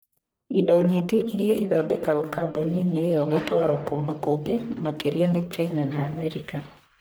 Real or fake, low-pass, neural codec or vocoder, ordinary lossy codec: fake; none; codec, 44.1 kHz, 1.7 kbps, Pupu-Codec; none